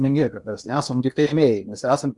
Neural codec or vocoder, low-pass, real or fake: codec, 16 kHz in and 24 kHz out, 0.8 kbps, FocalCodec, streaming, 65536 codes; 10.8 kHz; fake